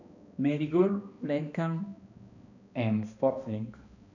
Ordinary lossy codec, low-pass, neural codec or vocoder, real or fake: none; 7.2 kHz; codec, 16 kHz, 1 kbps, X-Codec, HuBERT features, trained on balanced general audio; fake